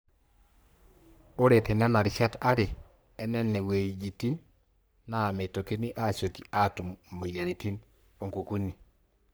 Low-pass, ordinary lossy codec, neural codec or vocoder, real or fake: none; none; codec, 44.1 kHz, 3.4 kbps, Pupu-Codec; fake